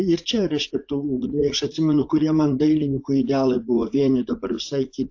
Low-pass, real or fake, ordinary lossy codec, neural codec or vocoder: 7.2 kHz; fake; AAC, 48 kbps; vocoder, 44.1 kHz, 80 mel bands, Vocos